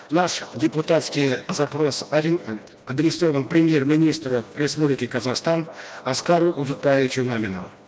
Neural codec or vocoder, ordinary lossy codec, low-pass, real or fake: codec, 16 kHz, 1 kbps, FreqCodec, smaller model; none; none; fake